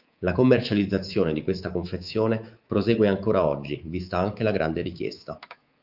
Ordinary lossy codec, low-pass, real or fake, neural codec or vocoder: Opus, 24 kbps; 5.4 kHz; fake; codec, 24 kHz, 3.1 kbps, DualCodec